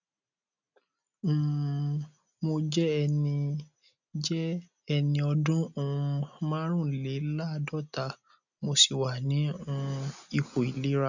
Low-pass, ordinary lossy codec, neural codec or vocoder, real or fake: 7.2 kHz; none; none; real